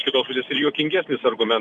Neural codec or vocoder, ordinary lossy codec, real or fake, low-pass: none; Opus, 64 kbps; real; 10.8 kHz